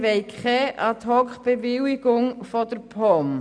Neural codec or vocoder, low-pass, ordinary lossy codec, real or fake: none; 9.9 kHz; none; real